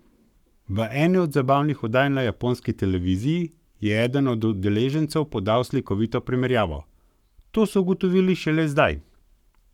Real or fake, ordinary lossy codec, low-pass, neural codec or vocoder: fake; none; 19.8 kHz; codec, 44.1 kHz, 7.8 kbps, Pupu-Codec